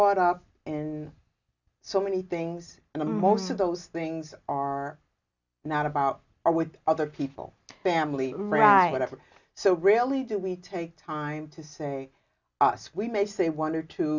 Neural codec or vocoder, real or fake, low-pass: none; real; 7.2 kHz